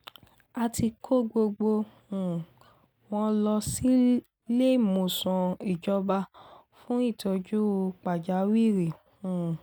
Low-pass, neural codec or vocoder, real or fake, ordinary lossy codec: none; none; real; none